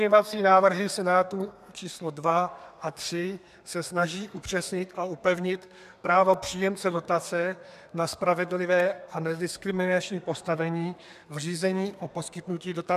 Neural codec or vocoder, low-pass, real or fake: codec, 32 kHz, 1.9 kbps, SNAC; 14.4 kHz; fake